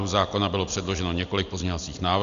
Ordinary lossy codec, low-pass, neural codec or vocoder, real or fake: Opus, 64 kbps; 7.2 kHz; none; real